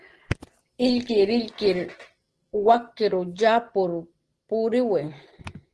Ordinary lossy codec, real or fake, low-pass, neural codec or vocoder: Opus, 16 kbps; real; 10.8 kHz; none